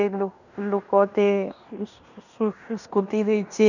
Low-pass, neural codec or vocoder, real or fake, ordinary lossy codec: 7.2 kHz; codec, 16 kHz in and 24 kHz out, 0.9 kbps, LongCat-Audio-Codec, fine tuned four codebook decoder; fake; none